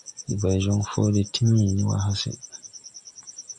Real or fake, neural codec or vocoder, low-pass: real; none; 10.8 kHz